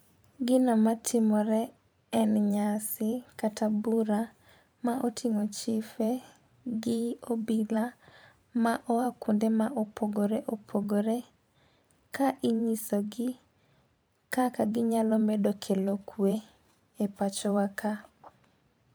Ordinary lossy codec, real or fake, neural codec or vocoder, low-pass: none; fake; vocoder, 44.1 kHz, 128 mel bands every 512 samples, BigVGAN v2; none